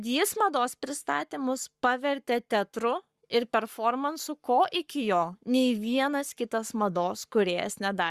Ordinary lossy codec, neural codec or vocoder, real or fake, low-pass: Opus, 64 kbps; codec, 44.1 kHz, 7.8 kbps, Pupu-Codec; fake; 14.4 kHz